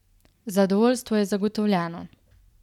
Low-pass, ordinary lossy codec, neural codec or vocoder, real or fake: 19.8 kHz; none; none; real